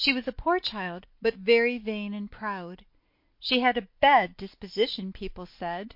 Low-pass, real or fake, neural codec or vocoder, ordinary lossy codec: 5.4 kHz; real; none; MP3, 32 kbps